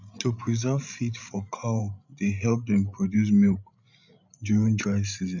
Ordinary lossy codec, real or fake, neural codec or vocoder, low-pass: none; fake; codec, 16 kHz, 16 kbps, FreqCodec, larger model; 7.2 kHz